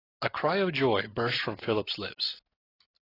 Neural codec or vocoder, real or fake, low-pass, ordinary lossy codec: none; real; 5.4 kHz; AAC, 24 kbps